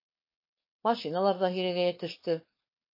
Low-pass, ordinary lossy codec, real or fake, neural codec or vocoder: 5.4 kHz; MP3, 24 kbps; fake; codec, 16 kHz, 4.8 kbps, FACodec